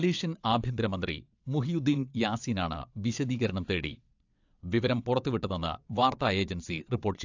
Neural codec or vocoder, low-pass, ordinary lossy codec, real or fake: vocoder, 22.05 kHz, 80 mel bands, WaveNeXt; 7.2 kHz; AAC, 48 kbps; fake